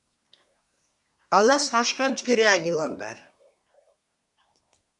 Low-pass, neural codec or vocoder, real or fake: 10.8 kHz; codec, 24 kHz, 1 kbps, SNAC; fake